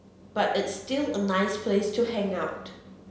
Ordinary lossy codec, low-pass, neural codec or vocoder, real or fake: none; none; none; real